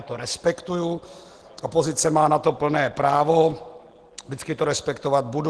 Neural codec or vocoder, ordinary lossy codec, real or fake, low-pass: none; Opus, 16 kbps; real; 10.8 kHz